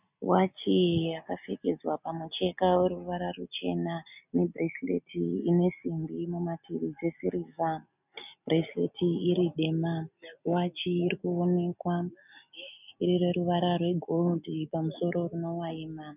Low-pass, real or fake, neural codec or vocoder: 3.6 kHz; real; none